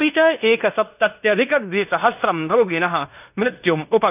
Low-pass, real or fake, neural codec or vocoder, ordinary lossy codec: 3.6 kHz; fake; codec, 16 kHz in and 24 kHz out, 0.9 kbps, LongCat-Audio-Codec, fine tuned four codebook decoder; none